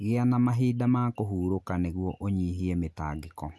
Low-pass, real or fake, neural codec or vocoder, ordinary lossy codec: none; real; none; none